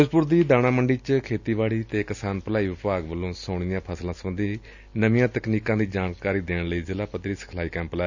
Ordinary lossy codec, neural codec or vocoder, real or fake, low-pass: none; none; real; 7.2 kHz